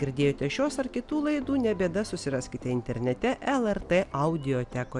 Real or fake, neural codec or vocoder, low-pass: real; none; 10.8 kHz